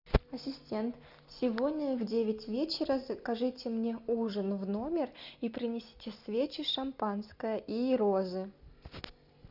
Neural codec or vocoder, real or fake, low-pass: none; real; 5.4 kHz